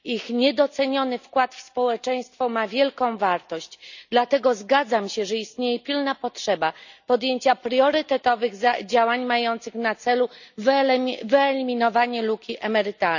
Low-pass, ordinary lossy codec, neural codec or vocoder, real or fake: 7.2 kHz; none; none; real